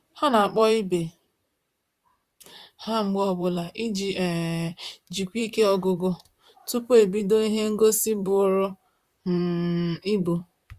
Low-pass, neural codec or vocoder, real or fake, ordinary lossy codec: 14.4 kHz; vocoder, 44.1 kHz, 128 mel bands, Pupu-Vocoder; fake; Opus, 64 kbps